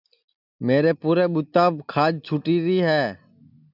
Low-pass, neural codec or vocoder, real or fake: 5.4 kHz; none; real